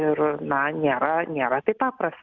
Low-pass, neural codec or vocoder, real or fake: 7.2 kHz; none; real